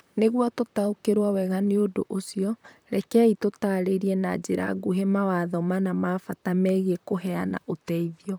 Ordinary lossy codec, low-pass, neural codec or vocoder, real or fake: none; none; vocoder, 44.1 kHz, 128 mel bands, Pupu-Vocoder; fake